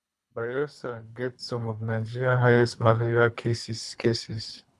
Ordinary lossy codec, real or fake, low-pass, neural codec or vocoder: none; fake; none; codec, 24 kHz, 3 kbps, HILCodec